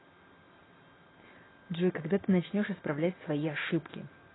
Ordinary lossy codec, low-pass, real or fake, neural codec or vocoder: AAC, 16 kbps; 7.2 kHz; real; none